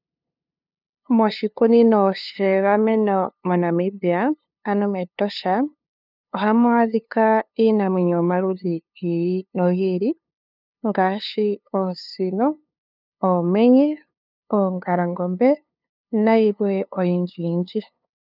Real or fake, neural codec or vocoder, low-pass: fake; codec, 16 kHz, 2 kbps, FunCodec, trained on LibriTTS, 25 frames a second; 5.4 kHz